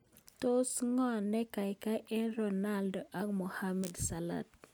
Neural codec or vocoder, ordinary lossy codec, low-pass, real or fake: none; none; none; real